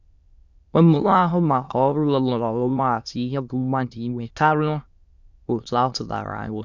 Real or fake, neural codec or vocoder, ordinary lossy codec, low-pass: fake; autoencoder, 22.05 kHz, a latent of 192 numbers a frame, VITS, trained on many speakers; Opus, 64 kbps; 7.2 kHz